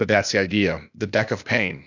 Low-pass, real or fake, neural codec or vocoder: 7.2 kHz; fake; codec, 16 kHz, 0.8 kbps, ZipCodec